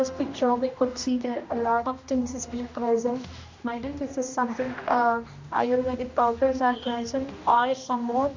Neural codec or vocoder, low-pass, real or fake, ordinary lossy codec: codec, 16 kHz, 1 kbps, X-Codec, HuBERT features, trained on general audio; 7.2 kHz; fake; MP3, 48 kbps